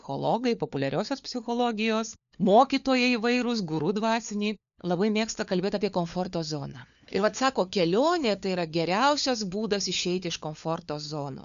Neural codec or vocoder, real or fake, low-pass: codec, 16 kHz, 4 kbps, FunCodec, trained on LibriTTS, 50 frames a second; fake; 7.2 kHz